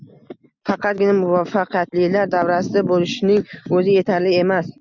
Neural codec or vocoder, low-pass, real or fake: none; 7.2 kHz; real